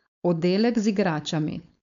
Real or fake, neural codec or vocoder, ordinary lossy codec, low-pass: fake; codec, 16 kHz, 4.8 kbps, FACodec; none; 7.2 kHz